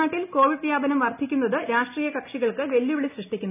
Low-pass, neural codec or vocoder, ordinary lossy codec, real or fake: 3.6 kHz; none; none; real